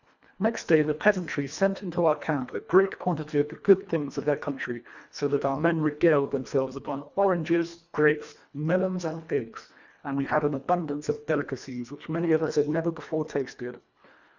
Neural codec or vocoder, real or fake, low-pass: codec, 24 kHz, 1.5 kbps, HILCodec; fake; 7.2 kHz